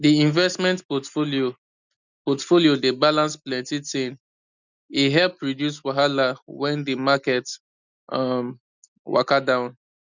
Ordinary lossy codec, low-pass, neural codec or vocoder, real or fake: none; 7.2 kHz; none; real